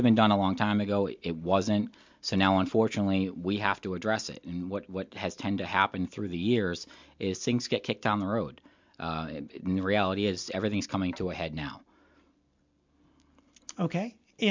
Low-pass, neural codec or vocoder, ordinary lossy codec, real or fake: 7.2 kHz; none; MP3, 64 kbps; real